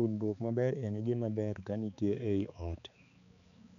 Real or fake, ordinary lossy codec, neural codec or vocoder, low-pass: fake; none; codec, 16 kHz, 4 kbps, X-Codec, HuBERT features, trained on balanced general audio; 7.2 kHz